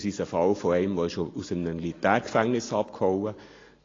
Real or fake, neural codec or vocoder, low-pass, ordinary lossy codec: real; none; 7.2 kHz; AAC, 32 kbps